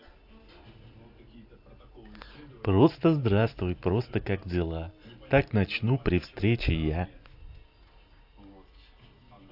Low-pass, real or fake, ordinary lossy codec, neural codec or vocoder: 5.4 kHz; real; AAC, 48 kbps; none